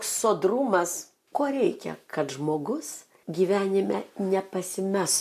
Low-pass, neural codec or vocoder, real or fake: 14.4 kHz; none; real